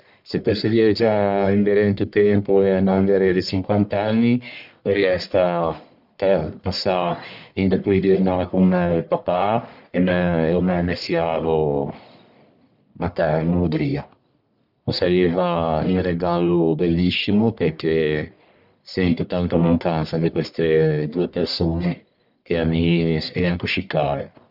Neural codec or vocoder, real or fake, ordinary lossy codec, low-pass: codec, 44.1 kHz, 1.7 kbps, Pupu-Codec; fake; none; 5.4 kHz